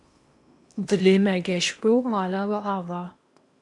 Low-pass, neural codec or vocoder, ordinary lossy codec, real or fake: 10.8 kHz; codec, 16 kHz in and 24 kHz out, 0.8 kbps, FocalCodec, streaming, 65536 codes; MP3, 96 kbps; fake